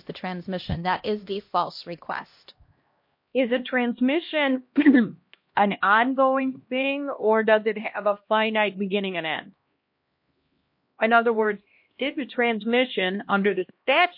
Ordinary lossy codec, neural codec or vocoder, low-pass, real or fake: MP3, 32 kbps; codec, 16 kHz, 1 kbps, X-Codec, HuBERT features, trained on LibriSpeech; 5.4 kHz; fake